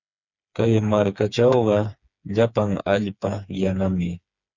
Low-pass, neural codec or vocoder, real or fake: 7.2 kHz; codec, 16 kHz, 4 kbps, FreqCodec, smaller model; fake